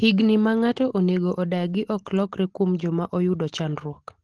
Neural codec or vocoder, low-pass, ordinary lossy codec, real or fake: none; 10.8 kHz; Opus, 16 kbps; real